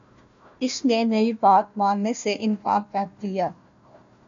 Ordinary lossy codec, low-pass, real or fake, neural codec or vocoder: MP3, 64 kbps; 7.2 kHz; fake; codec, 16 kHz, 1 kbps, FunCodec, trained on Chinese and English, 50 frames a second